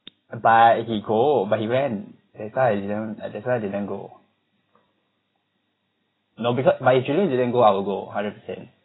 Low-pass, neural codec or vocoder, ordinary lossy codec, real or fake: 7.2 kHz; none; AAC, 16 kbps; real